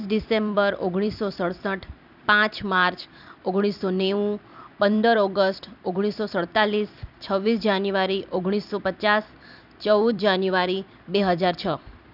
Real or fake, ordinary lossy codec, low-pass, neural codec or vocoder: fake; none; 5.4 kHz; vocoder, 44.1 kHz, 128 mel bands every 256 samples, BigVGAN v2